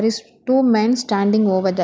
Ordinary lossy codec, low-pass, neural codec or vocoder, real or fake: none; none; none; real